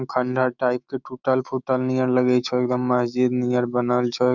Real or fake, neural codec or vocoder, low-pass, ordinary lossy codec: fake; autoencoder, 48 kHz, 128 numbers a frame, DAC-VAE, trained on Japanese speech; 7.2 kHz; none